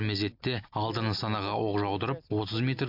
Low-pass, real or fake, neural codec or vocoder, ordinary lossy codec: 5.4 kHz; real; none; none